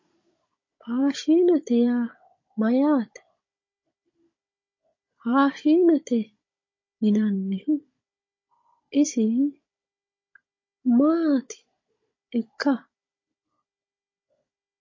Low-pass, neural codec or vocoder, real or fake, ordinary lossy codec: 7.2 kHz; codec, 16 kHz, 16 kbps, FunCodec, trained on Chinese and English, 50 frames a second; fake; MP3, 32 kbps